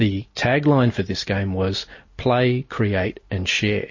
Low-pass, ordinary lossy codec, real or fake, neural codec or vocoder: 7.2 kHz; MP3, 32 kbps; real; none